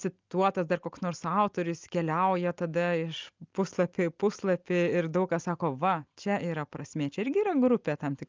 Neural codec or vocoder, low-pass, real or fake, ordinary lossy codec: none; 7.2 kHz; real; Opus, 24 kbps